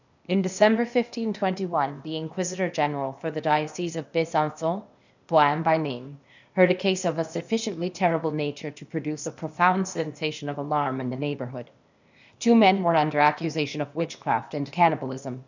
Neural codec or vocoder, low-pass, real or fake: codec, 16 kHz, 0.8 kbps, ZipCodec; 7.2 kHz; fake